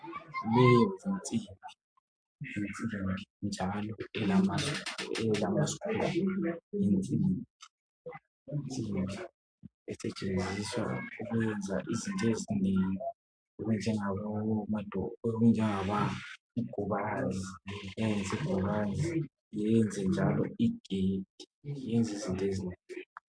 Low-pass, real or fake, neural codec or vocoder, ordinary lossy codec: 9.9 kHz; real; none; MP3, 64 kbps